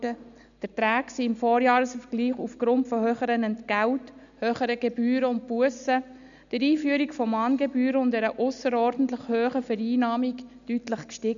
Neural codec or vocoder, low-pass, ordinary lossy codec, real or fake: none; 7.2 kHz; none; real